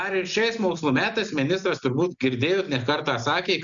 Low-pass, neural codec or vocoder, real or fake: 7.2 kHz; none; real